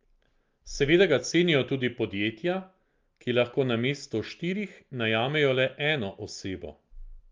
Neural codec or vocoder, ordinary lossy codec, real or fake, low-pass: none; Opus, 32 kbps; real; 7.2 kHz